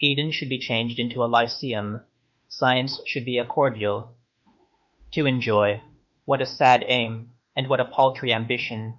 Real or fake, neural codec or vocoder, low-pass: fake; autoencoder, 48 kHz, 32 numbers a frame, DAC-VAE, trained on Japanese speech; 7.2 kHz